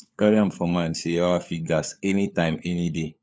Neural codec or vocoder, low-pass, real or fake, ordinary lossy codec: codec, 16 kHz, 4 kbps, FunCodec, trained on LibriTTS, 50 frames a second; none; fake; none